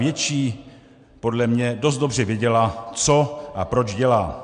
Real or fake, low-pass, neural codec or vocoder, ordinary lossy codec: real; 9.9 kHz; none; MP3, 64 kbps